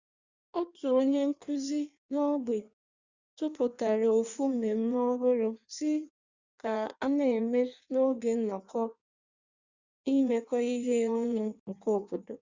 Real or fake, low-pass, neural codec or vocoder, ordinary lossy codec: fake; 7.2 kHz; codec, 16 kHz in and 24 kHz out, 1.1 kbps, FireRedTTS-2 codec; Opus, 64 kbps